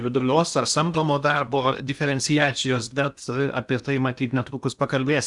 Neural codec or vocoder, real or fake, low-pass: codec, 16 kHz in and 24 kHz out, 0.8 kbps, FocalCodec, streaming, 65536 codes; fake; 10.8 kHz